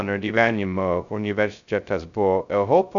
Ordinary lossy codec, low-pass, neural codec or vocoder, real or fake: MP3, 96 kbps; 7.2 kHz; codec, 16 kHz, 0.2 kbps, FocalCodec; fake